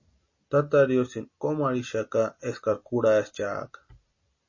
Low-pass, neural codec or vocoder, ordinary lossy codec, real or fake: 7.2 kHz; none; MP3, 32 kbps; real